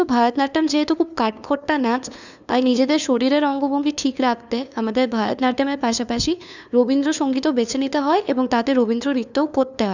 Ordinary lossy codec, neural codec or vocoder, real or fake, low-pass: none; codec, 16 kHz, 2 kbps, FunCodec, trained on LibriTTS, 25 frames a second; fake; 7.2 kHz